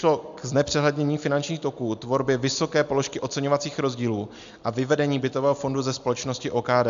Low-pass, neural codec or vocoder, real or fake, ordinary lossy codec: 7.2 kHz; none; real; MP3, 64 kbps